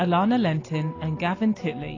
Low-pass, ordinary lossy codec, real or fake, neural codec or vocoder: 7.2 kHz; AAC, 32 kbps; real; none